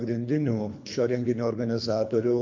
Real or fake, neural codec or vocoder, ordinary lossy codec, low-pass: fake; codec, 24 kHz, 3 kbps, HILCodec; MP3, 48 kbps; 7.2 kHz